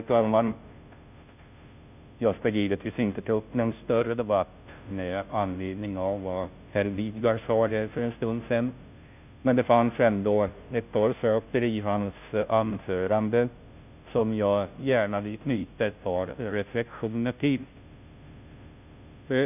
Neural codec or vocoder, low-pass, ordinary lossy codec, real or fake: codec, 16 kHz, 0.5 kbps, FunCodec, trained on Chinese and English, 25 frames a second; 3.6 kHz; none; fake